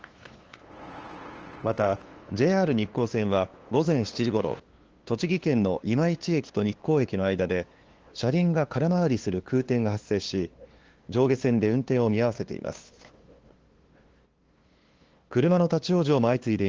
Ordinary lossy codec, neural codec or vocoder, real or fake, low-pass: Opus, 16 kbps; codec, 16 kHz, 2 kbps, FunCodec, trained on LibriTTS, 25 frames a second; fake; 7.2 kHz